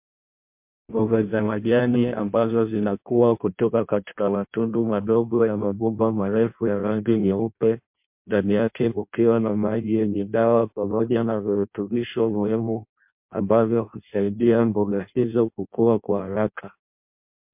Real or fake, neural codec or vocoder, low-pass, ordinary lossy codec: fake; codec, 16 kHz in and 24 kHz out, 0.6 kbps, FireRedTTS-2 codec; 3.6 kHz; MP3, 32 kbps